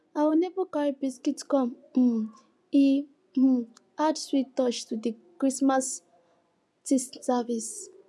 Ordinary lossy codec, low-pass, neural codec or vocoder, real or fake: none; none; vocoder, 24 kHz, 100 mel bands, Vocos; fake